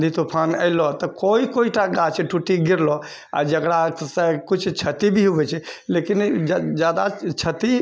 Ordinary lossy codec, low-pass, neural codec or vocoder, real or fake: none; none; none; real